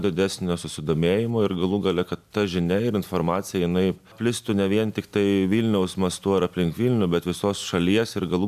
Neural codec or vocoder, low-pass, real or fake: none; 14.4 kHz; real